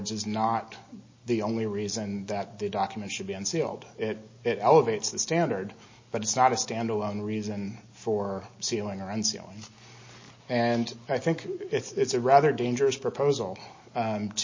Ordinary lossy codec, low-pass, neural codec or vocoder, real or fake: MP3, 32 kbps; 7.2 kHz; none; real